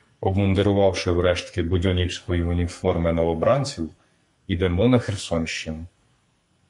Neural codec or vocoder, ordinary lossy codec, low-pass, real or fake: codec, 44.1 kHz, 2.6 kbps, SNAC; MP3, 64 kbps; 10.8 kHz; fake